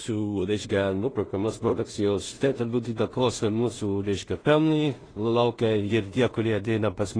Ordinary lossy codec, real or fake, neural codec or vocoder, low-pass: AAC, 32 kbps; fake; codec, 16 kHz in and 24 kHz out, 0.4 kbps, LongCat-Audio-Codec, two codebook decoder; 9.9 kHz